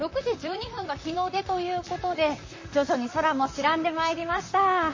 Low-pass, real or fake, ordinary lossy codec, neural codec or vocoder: 7.2 kHz; fake; MP3, 32 kbps; vocoder, 44.1 kHz, 128 mel bands, Pupu-Vocoder